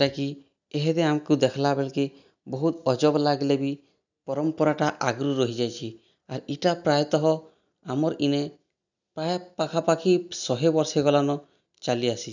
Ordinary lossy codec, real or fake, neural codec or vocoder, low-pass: none; real; none; 7.2 kHz